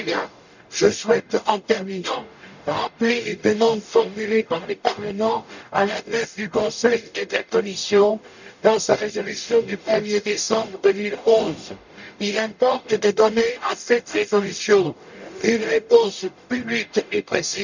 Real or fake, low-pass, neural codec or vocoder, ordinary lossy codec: fake; 7.2 kHz; codec, 44.1 kHz, 0.9 kbps, DAC; none